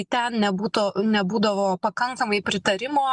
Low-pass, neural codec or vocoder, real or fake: 10.8 kHz; none; real